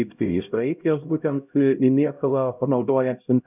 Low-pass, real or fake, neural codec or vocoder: 3.6 kHz; fake; codec, 16 kHz, 0.5 kbps, X-Codec, HuBERT features, trained on LibriSpeech